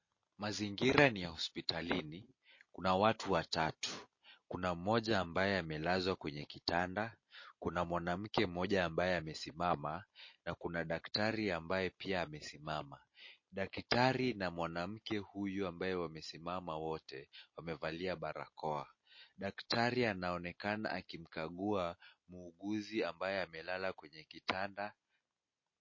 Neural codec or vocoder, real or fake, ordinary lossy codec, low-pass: none; real; MP3, 32 kbps; 7.2 kHz